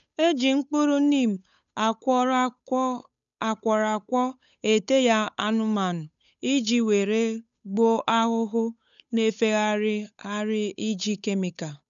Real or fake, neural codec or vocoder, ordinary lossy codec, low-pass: fake; codec, 16 kHz, 8 kbps, FunCodec, trained on Chinese and English, 25 frames a second; none; 7.2 kHz